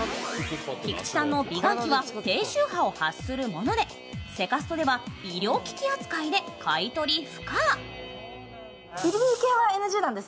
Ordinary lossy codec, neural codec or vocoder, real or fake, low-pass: none; none; real; none